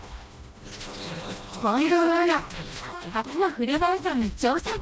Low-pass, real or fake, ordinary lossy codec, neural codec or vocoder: none; fake; none; codec, 16 kHz, 1 kbps, FreqCodec, smaller model